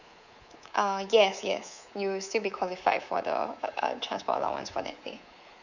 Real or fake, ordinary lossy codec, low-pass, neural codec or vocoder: fake; none; 7.2 kHz; codec, 24 kHz, 3.1 kbps, DualCodec